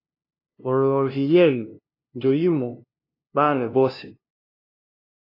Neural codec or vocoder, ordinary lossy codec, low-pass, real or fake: codec, 16 kHz, 0.5 kbps, FunCodec, trained on LibriTTS, 25 frames a second; AAC, 32 kbps; 5.4 kHz; fake